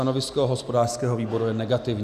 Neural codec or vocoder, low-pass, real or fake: none; 14.4 kHz; real